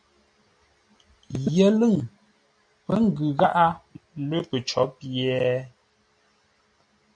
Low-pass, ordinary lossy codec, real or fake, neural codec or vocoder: 9.9 kHz; AAC, 64 kbps; real; none